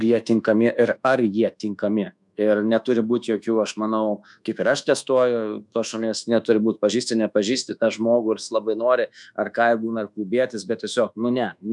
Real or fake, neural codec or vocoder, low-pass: fake; codec, 24 kHz, 1.2 kbps, DualCodec; 10.8 kHz